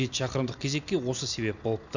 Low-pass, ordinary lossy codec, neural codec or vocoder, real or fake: 7.2 kHz; none; none; real